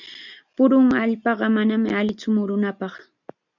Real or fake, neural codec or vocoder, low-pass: real; none; 7.2 kHz